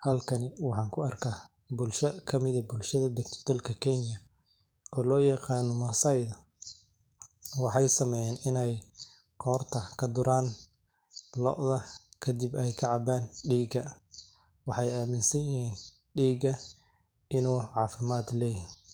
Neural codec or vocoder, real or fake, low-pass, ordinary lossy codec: codec, 44.1 kHz, 7.8 kbps, DAC; fake; none; none